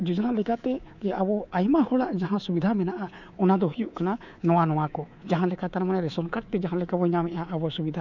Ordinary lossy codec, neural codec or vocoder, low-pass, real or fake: none; codec, 24 kHz, 3.1 kbps, DualCodec; 7.2 kHz; fake